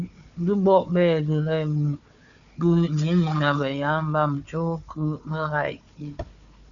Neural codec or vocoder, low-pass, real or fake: codec, 16 kHz, 4 kbps, FunCodec, trained on Chinese and English, 50 frames a second; 7.2 kHz; fake